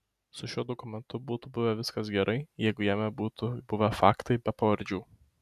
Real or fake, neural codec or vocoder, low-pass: real; none; 14.4 kHz